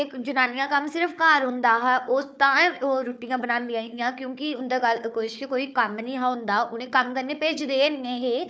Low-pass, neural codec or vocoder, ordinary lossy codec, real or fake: none; codec, 16 kHz, 4 kbps, FunCodec, trained on Chinese and English, 50 frames a second; none; fake